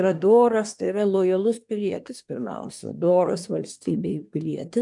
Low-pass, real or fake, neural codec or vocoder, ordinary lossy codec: 10.8 kHz; fake; codec, 24 kHz, 1 kbps, SNAC; MP3, 96 kbps